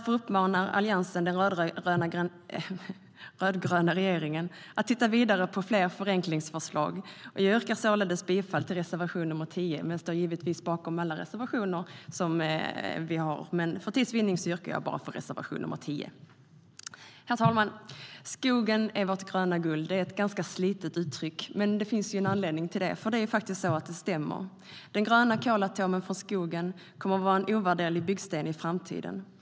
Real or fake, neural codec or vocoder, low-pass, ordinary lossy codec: real; none; none; none